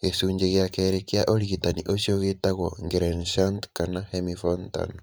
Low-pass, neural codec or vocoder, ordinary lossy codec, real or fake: none; vocoder, 44.1 kHz, 128 mel bands every 512 samples, BigVGAN v2; none; fake